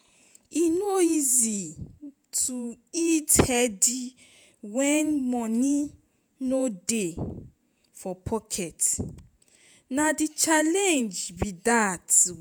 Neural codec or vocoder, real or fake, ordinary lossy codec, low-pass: vocoder, 48 kHz, 128 mel bands, Vocos; fake; none; none